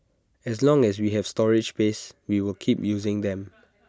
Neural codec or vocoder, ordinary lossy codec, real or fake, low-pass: none; none; real; none